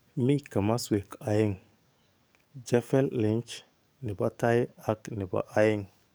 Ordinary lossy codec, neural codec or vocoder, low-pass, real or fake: none; codec, 44.1 kHz, 7.8 kbps, DAC; none; fake